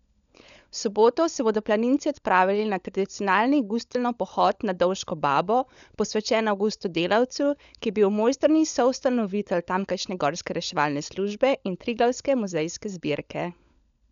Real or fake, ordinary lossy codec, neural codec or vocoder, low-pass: fake; none; codec, 16 kHz, 16 kbps, FunCodec, trained on LibriTTS, 50 frames a second; 7.2 kHz